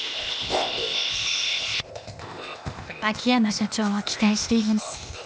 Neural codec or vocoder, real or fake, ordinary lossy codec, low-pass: codec, 16 kHz, 0.8 kbps, ZipCodec; fake; none; none